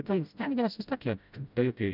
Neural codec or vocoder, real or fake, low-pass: codec, 16 kHz, 0.5 kbps, FreqCodec, smaller model; fake; 5.4 kHz